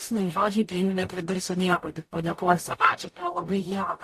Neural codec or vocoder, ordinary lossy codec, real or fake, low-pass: codec, 44.1 kHz, 0.9 kbps, DAC; AAC, 64 kbps; fake; 14.4 kHz